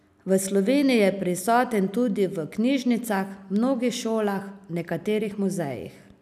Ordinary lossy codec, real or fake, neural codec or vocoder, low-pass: none; real; none; 14.4 kHz